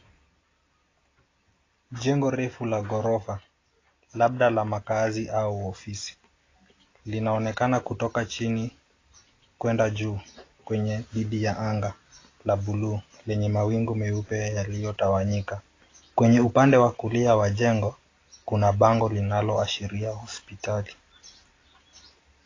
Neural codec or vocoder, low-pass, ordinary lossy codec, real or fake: none; 7.2 kHz; AAC, 32 kbps; real